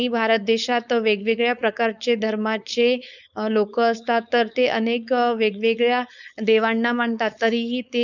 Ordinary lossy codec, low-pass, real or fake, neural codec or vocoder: none; 7.2 kHz; fake; codec, 16 kHz, 4.8 kbps, FACodec